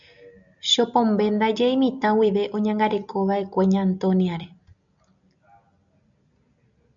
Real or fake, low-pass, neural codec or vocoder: real; 7.2 kHz; none